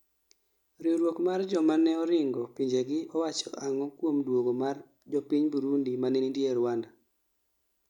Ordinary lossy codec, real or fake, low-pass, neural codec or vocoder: none; real; 19.8 kHz; none